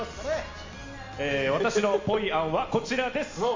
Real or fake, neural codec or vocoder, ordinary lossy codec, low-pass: real; none; MP3, 48 kbps; 7.2 kHz